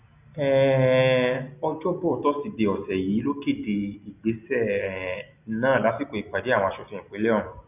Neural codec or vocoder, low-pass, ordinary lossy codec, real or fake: none; 3.6 kHz; none; real